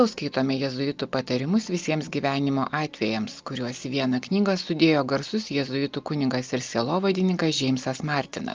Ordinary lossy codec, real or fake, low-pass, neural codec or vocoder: Opus, 32 kbps; real; 7.2 kHz; none